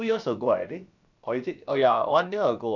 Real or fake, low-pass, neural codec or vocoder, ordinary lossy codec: fake; 7.2 kHz; codec, 16 kHz, about 1 kbps, DyCAST, with the encoder's durations; none